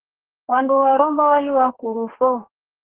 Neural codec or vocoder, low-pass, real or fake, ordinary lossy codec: codec, 32 kHz, 1.9 kbps, SNAC; 3.6 kHz; fake; Opus, 16 kbps